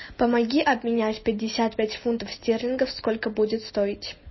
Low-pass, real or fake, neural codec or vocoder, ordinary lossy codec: 7.2 kHz; real; none; MP3, 24 kbps